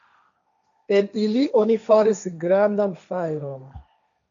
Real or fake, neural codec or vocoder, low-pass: fake; codec, 16 kHz, 1.1 kbps, Voila-Tokenizer; 7.2 kHz